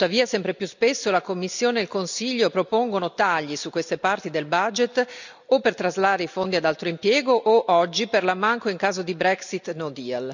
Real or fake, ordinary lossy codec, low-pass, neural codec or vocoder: real; none; 7.2 kHz; none